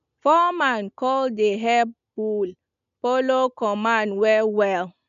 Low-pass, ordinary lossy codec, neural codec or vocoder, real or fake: 7.2 kHz; none; none; real